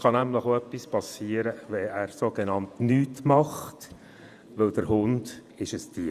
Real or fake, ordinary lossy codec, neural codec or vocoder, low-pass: fake; Opus, 64 kbps; vocoder, 48 kHz, 128 mel bands, Vocos; 14.4 kHz